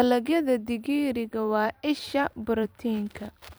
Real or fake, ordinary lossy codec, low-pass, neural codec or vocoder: fake; none; none; vocoder, 44.1 kHz, 128 mel bands every 256 samples, BigVGAN v2